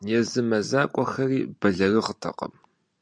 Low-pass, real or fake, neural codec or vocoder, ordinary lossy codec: 9.9 kHz; real; none; MP3, 64 kbps